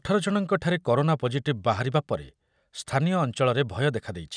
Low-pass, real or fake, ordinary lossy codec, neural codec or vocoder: 9.9 kHz; real; none; none